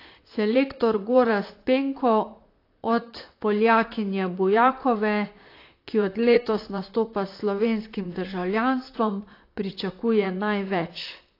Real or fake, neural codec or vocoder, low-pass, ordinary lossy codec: fake; vocoder, 44.1 kHz, 128 mel bands, Pupu-Vocoder; 5.4 kHz; AAC, 32 kbps